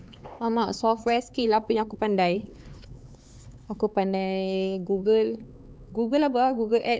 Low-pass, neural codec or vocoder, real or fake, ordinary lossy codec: none; codec, 16 kHz, 4 kbps, X-Codec, HuBERT features, trained on LibriSpeech; fake; none